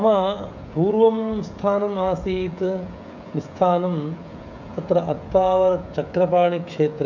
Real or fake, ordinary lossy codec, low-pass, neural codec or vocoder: fake; none; 7.2 kHz; codec, 16 kHz, 16 kbps, FreqCodec, smaller model